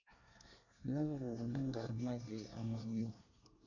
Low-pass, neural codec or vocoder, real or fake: 7.2 kHz; codec, 24 kHz, 1 kbps, SNAC; fake